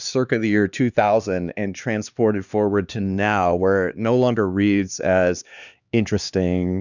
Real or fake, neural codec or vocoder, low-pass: fake; codec, 16 kHz, 2 kbps, X-Codec, HuBERT features, trained on LibriSpeech; 7.2 kHz